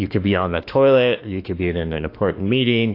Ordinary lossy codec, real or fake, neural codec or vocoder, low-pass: AAC, 32 kbps; fake; autoencoder, 48 kHz, 32 numbers a frame, DAC-VAE, trained on Japanese speech; 5.4 kHz